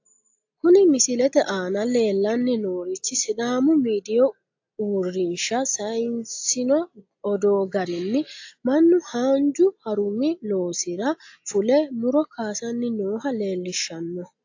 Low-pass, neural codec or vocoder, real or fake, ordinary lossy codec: 7.2 kHz; none; real; AAC, 48 kbps